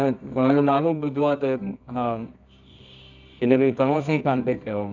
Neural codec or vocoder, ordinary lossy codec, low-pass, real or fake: codec, 24 kHz, 0.9 kbps, WavTokenizer, medium music audio release; none; 7.2 kHz; fake